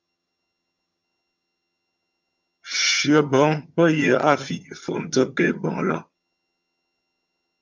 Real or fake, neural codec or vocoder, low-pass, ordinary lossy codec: fake; vocoder, 22.05 kHz, 80 mel bands, HiFi-GAN; 7.2 kHz; MP3, 64 kbps